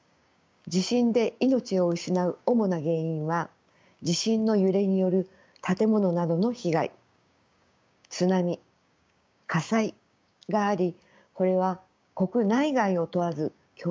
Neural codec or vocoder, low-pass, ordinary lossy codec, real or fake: codec, 16 kHz, 6 kbps, DAC; none; none; fake